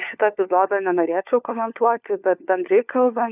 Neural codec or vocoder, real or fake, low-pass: codec, 16 kHz, 4 kbps, FunCodec, trained on LibriTTS, 50 frames a second; fake; 3.6 kHz